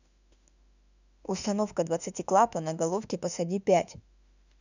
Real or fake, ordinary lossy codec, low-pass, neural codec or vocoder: fake; none; 7.2 kHz; autoencoder, 48 kHz, 32 numbers a frame, DAC-VAE, trained on Japanese speech